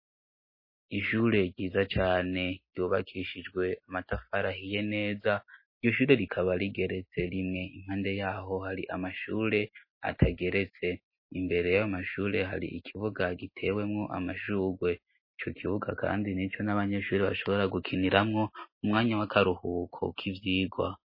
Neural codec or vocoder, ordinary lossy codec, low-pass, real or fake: none; MP3, 24 kbps; 5.4 kHz; real